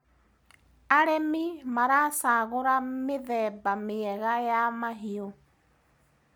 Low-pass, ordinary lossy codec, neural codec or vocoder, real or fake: none; none; none; real